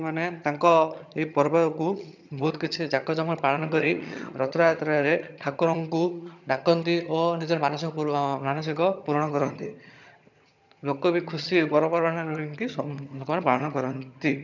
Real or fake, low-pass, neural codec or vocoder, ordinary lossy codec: fake; 7.2 kHz; vocoder, 22.05 kHz, 80 mel bands, HiFi-GAN; none